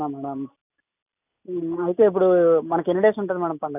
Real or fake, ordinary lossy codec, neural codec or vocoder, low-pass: real; none; none; 3.6 kHz